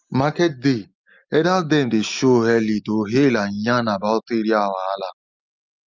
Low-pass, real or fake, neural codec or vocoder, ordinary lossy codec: 7.2 kHz; real; none; Opus, 32 kbps